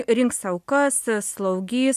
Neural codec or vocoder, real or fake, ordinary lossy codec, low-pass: none; real; AAC, 96 kbps; 14.4 kHz